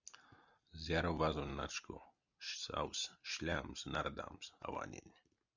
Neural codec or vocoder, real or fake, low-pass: none; real; 7.2 kHz